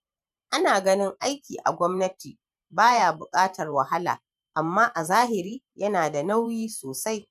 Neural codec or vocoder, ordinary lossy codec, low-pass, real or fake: vocoder, 44.1 kHz, 128 mel bands every 512 samples, BigVGAN v2; none; 14.4 kHz; fake